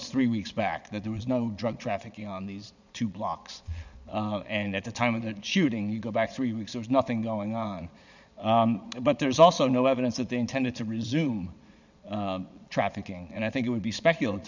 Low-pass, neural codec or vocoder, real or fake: 7.2 kHz; vocoder, 22.05 kHz, 80 mel bands, Vocos; fake